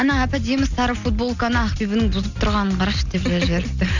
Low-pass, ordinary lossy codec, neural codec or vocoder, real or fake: 7.2 kHz; none; none; real